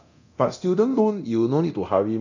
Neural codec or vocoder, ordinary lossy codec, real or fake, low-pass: codec, 24 kHz, 0.9 kbps, DualCodec; none; fake; 7.2 kHz